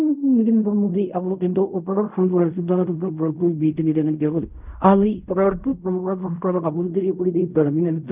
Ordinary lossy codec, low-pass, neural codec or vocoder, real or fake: none; 3.6 kHz; codec, 16 kHz in and 24 kHz out, 0.4 kbps, LongCat-Audio-Codec, fine tuned four codebook decoder; fake